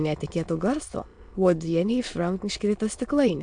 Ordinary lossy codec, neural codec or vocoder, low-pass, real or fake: MP3, 64 kbps; autoencoder, 22.05 kHz, a latent of 192 numbers a frame, VITS, trained on many speakers; 9.9 kHz; fake